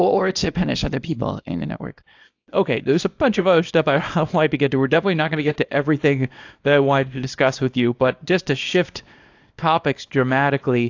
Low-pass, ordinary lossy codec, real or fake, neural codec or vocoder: 7.2 kHz; AAC, 48 kbps; fake; codec, 24 kHz, 0.9 kbps, WavTokenizer, small release